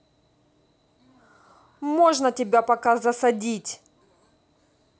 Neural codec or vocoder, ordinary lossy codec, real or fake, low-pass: none; none; real; none